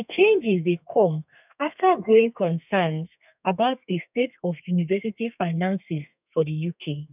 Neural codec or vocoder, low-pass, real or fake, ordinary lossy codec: codec, 32 kHz, 1.9 kbps, SNAC; 3.6 kHz; fake; none